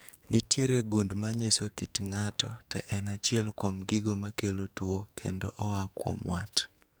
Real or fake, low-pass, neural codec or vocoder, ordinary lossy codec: fake; none; codec, 44.1 kHz, 2.6 kbps, SNAC; none